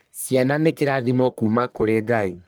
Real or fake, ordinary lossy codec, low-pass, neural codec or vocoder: fake; none; none; codec, 44.1 kHz, 1.7 kbps, Pupu-Codec